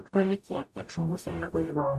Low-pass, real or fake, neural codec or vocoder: 14.4 kHz; fake; codec, 44.1 kHz, 0.9 kbps, DAC